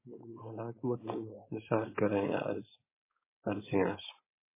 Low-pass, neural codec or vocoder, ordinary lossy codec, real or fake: 3.6 kHz; codec, 16 kHz, 4.8 kbps, FACodec; MP3, 16 kbps; fake